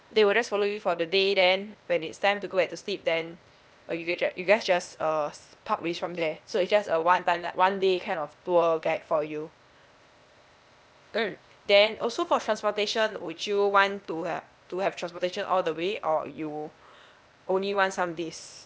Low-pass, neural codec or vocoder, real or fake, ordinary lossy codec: none; codec, 16 kHz, 0.8 kbps, ZipCodec; fake; none